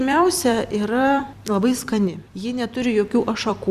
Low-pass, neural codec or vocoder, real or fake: 14.4 kHz; vocoder, 44.1 kHz, 128 mel bands every 256 samples, BigVGAN v2; fake